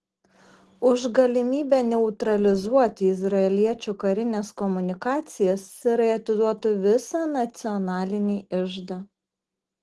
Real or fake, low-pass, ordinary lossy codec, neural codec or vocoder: real; 10.8 kHz; Opus, 16 kbps; none